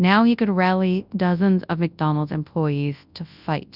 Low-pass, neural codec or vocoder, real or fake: 5.4 kHz; codec, 24 kHz, 0.9 kbps, WavTokenizer, large speech release; fake